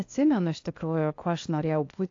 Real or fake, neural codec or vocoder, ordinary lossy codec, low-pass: fake; codec, 16 kHz, 0.8 kbps, ZipCodec; AAC, 48 kbps; 7.2 kHz